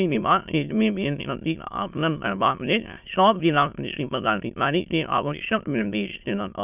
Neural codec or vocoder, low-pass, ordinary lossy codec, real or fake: autoencoder, 22.05 kHz, a latent of 192 numbers a frame, VITS, trained on many speakers; 3.6 kHz; none; fake